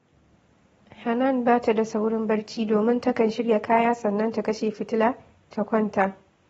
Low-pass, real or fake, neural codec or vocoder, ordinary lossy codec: 19.8 kHz; real; none; AAC, 24 kbps